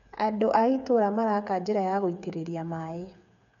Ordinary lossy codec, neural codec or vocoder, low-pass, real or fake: none; codec, 16 kHz, 8 kbps, FreqCodec, smaller model; 7.2 kHz; fake